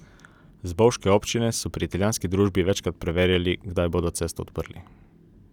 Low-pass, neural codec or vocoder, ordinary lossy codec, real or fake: 19.8 kHz; none; none; real